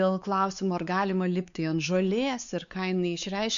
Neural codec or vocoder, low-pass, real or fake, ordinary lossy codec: codec, 16 kHz, 4 kbps, X-Codec, WavLM features, trained on Multilingual LibriSpeech; 7.2 kHz; fake; MP3, 64 kbps